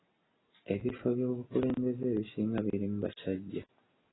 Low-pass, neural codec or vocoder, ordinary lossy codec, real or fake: 7.2 kHz; none; AAC, 16 kbps; real